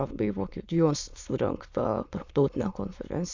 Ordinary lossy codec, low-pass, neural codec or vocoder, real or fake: Opus, 64 kbps; 7.2 kHz; autoencoder, 22.05 kHz, a latent of 192 numbers a frame, VITS, trained on many speakers; fake